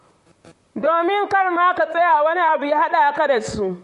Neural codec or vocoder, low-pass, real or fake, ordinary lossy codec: vocoder, 44.1 kHz, 128 mel bands, Pupu-Vocoder; 14.4 kHz; fake; MP3, 48 kbps